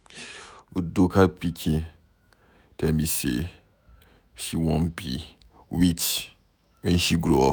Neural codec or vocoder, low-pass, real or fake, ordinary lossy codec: autoencoder, 48 kHz, 128 numbers a frame, DAC-VAE, trained on Japanese speech; none; fake; none